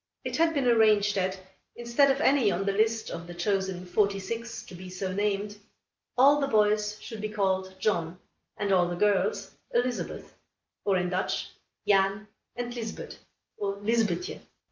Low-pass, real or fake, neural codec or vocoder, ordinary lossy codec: 7.2 kHz; real; none; Opus, 24 kbps